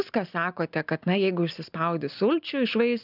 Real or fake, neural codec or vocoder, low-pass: real; none; 5.4 kHz